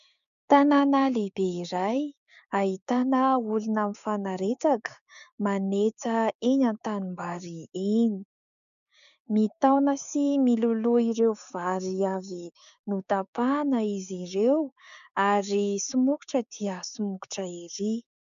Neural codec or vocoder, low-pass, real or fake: codec, 16 kHz, 6 kbps, DAC; 7.2 kHz; fake